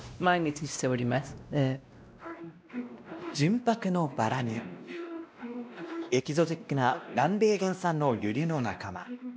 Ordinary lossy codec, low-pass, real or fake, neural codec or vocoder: none; none; fake; codec, 16 kHz, 1 kbps, X-Codec, WavLM features, trained on Multilingual LibriSpeech